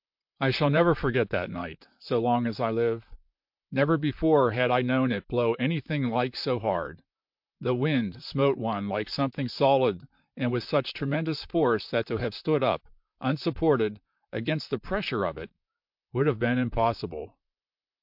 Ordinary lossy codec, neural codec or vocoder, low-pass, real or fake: MP3, 48 kbps; vocoder, 44.1 kHz, 128 mel bands, Pupu-Vocoder; 5.4 kHz; fake